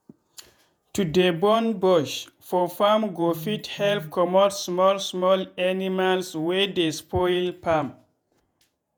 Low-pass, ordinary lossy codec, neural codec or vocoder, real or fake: none; none; vocoder, 48 kHz, 128 mel bands, Vocos; fake